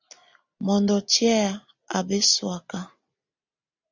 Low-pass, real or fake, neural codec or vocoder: 7.2 kHz; real; none